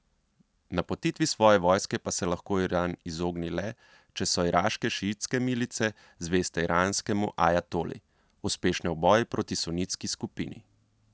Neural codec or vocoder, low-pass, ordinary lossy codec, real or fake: none; none; none; real